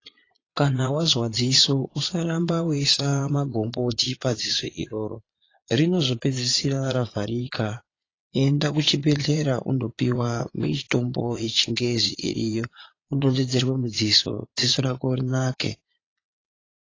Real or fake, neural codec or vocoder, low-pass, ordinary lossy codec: fake; vocoder, 22.05 kHz, 80 mel bands, Vocos; 7.2 kHz; AAC, 32 kbps